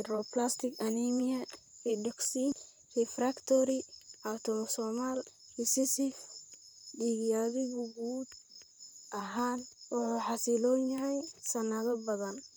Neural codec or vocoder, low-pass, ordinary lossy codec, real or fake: vocoder, 44.1 kHz, 128 mel bands, Pupu-Vocoder; none; none; fake